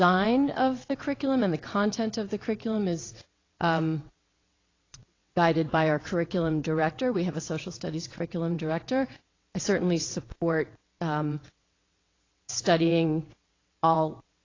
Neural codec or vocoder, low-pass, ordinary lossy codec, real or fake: vocoder, 22.05 kHz, 80 mel bands, WaveNeXt; 7.2 kHz; AAC, 32 kbps; fake